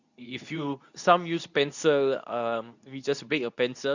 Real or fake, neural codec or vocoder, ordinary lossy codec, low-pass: fake; codec, 24 kHz, 0.9 kbps, WavTokenizer, medium speech release version 2; none; 7.2 kHz